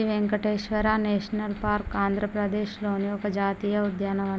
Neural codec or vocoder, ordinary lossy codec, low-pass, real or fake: none; none; none; real